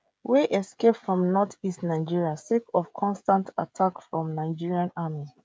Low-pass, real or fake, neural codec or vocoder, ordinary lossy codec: none; fake; codec, 16 kHz, 16 kbps, FreqCodec, smaller model; none